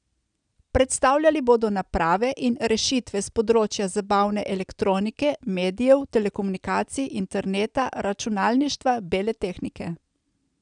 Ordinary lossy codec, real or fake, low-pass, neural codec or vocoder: none; real; 9.9 kHz; none